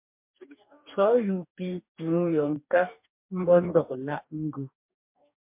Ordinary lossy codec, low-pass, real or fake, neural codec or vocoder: MP3, 32 kbps; 3.6 kHz; fake; codec, 44.1 kHz, 2.6 kbps, DAC